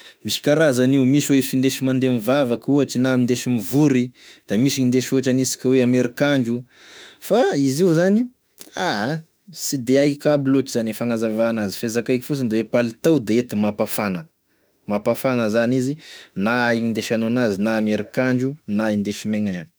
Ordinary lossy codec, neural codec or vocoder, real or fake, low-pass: none; autoencoder, 48 kHz, 32 numbers a frame, DAC-VAE, trained on Japanese speech; fake; none